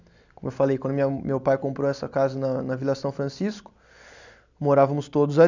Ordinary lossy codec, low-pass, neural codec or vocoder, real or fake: none; 7.2 kHz; none; real